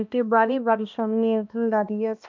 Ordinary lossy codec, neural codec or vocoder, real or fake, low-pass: MP3, 64 kbps; codec, 16 kHz, 1 kbps, X-Codec, HuBERT features, trained on balanced general audio; fake; 7.2 kHz